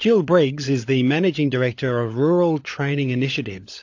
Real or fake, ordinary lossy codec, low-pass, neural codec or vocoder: fake; AAC, 48 kbps; 7.2 kHz; codec, 16 kHz, 16 kbps, FunCodec, trained on LibriTTS, 50 frames a second